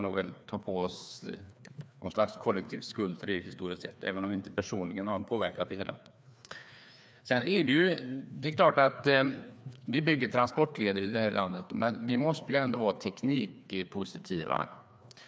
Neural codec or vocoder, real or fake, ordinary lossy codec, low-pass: codec, 16 kHz, 2 kbps, FreqCodec, larger model; fake; none; none